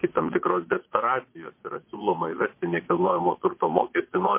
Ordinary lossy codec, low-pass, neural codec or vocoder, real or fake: MP3, 24 kbps; 3.6 kHz; vocoder, 22.05 kHz, 80 mel bands, Vocos; fake